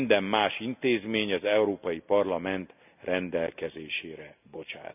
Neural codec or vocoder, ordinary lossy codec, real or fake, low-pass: none; none; real; 3.6 kHz